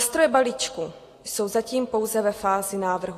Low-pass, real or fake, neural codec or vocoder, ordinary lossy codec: 14.4 kHz; real; none; AAC, 48 kbps